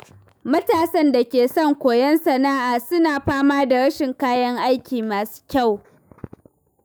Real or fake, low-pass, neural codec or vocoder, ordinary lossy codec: fake; none; autoencoder, 48 kHz, 128 numbers a frame, DAC-VAE, trained on Japanese speech; none